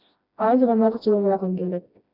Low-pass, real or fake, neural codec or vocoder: 5.4 kHz; fake; codec, 16 kHz, 1 kbps, FreqCodec, smaller model